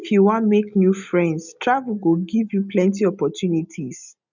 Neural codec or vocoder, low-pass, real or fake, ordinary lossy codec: none; 7.2 kHz; real; none